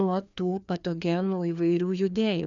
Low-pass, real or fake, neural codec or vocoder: 7.2 kHz; fake; codec, 16 kHz, 2 kbps, FreqCodec, larger model